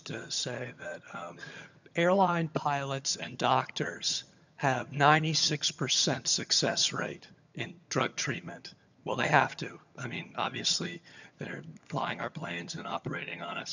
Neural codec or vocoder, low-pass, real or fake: vocoder, 22.05 kHz, 80 mel bands, HiFi-GAN; 7.2 kHz; fake